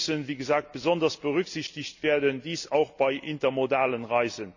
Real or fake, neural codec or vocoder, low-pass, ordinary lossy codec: real; none; 7.2 kHz; none